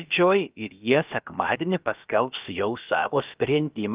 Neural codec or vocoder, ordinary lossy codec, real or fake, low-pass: codec, 16 kHz, about 1 kbps, DyCAST, with the encoder's durations; Opus, 32 kbps; fake; 3.6 kHz